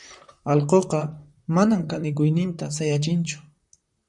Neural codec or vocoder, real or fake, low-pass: vocoder, 44.1 kHz, 128 mel bands, Pupu-Vocoder; fake; 10.8 kHz